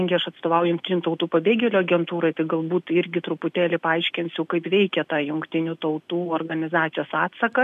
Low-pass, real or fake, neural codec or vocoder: 14.4 kHz; real; none